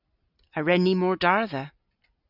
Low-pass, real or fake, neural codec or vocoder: 5.4 kHz; real; none